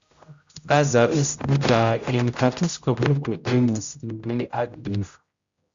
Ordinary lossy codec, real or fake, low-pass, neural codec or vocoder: Opus, 64 kbps; fake; 7.2 kHz; codec, 16 kHz, 0.5 kbps, X-Codec, HuBERT features, trained on general audio